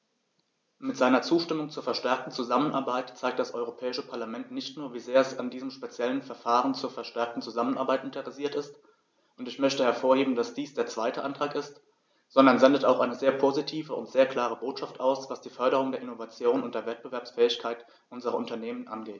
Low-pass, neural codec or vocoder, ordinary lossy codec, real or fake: none; none; none; real